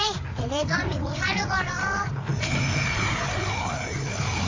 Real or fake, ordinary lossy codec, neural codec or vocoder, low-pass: fake; AAC, 32 kbps; codec, 16 kHz, 4 kbps, FreqCodec, larger model; 7.2 kHz